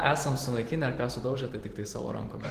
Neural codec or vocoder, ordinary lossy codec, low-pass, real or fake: vocoder, 44.1 kHz, 128 mel bands every 512 samples, BigVGAN v2; Opus, 16 kbps; 14.4 kHz; fake